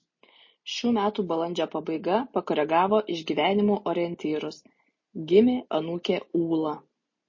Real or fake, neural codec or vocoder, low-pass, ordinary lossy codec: real; none; 7.2 kHz; MP3, 32 kbps